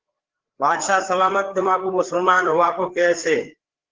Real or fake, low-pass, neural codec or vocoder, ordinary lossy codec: fake; 7.2 kHz; codec, 16 kHz, 4 kbps, FreqCodec, larger model; Opus, 16 kbps